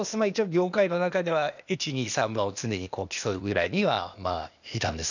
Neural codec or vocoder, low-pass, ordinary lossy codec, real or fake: codec, 16 kHz, 0.8 kbps, ZipCodec; 7.2 kHz; none; fake